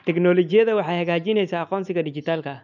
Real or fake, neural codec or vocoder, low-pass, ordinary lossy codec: real; none; 7.2 kHz; none